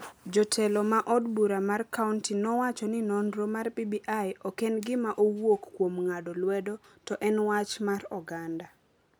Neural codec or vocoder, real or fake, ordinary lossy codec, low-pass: none; real; none; none